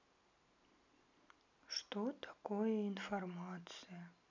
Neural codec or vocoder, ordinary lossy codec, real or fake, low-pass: none; none; real; 7.2 kHz